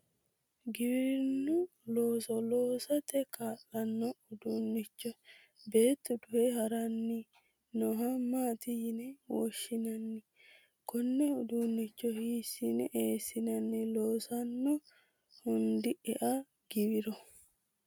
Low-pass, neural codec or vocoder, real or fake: 19.8 kHz; none; real